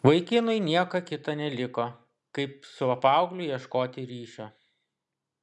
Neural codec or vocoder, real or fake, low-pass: none; real; 10.8 kHz